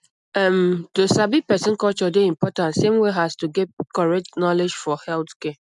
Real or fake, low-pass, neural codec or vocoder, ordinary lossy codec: real; 10.8 kHz; none; none